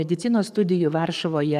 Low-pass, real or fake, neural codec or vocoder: 14.4 kHz; fake; codec, 44.1 kHz, 7.8 kbps, DAC